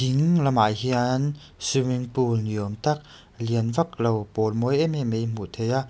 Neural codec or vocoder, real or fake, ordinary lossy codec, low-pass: none; real; none; none